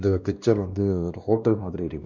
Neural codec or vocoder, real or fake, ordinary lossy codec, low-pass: codec, 16 kHz, 1 kbps, X-Codec, WavLM features, trained on Multilingual LibriSpeech; fake; none; 7.2 kHz